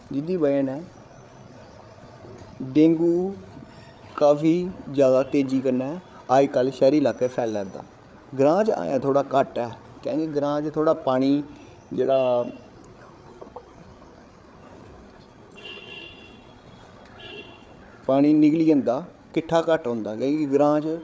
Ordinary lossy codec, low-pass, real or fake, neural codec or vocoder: none; none; fake; codec, 16 kHz, 8 kbps, FreqCodec, larger model